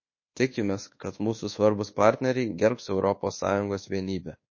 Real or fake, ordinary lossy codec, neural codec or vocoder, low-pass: fake; MP3, 32 kbps; codec, 24 kHz, 1.2 kbps, DualCodec; 7.2 kHz